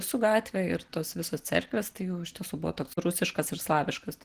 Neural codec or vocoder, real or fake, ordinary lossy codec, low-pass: none; real; Opus, 16 kbps; 14.4 kHz